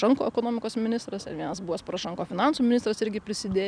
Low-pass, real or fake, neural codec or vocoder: 9.9 kHz; real; none